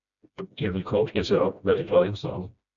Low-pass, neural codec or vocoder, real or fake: 7.2 kHz; codec, 16 kHz, 1 kbps, FreqCodec, smaller model; fake